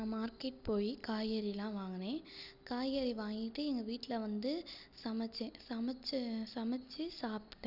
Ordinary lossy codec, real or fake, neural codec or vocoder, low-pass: none; real; none; 5.4 kHz